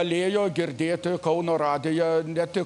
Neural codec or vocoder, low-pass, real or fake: none; 10.8 kHz; real